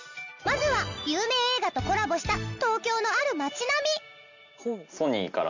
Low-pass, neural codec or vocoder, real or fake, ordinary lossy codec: 7.2 kHz; none; real; none